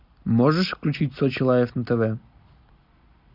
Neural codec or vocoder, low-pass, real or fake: none; 5.4 kHz; real